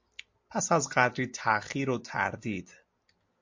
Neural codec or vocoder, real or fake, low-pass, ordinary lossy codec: none; real; 7.2 kHz; MP3, 48 kbps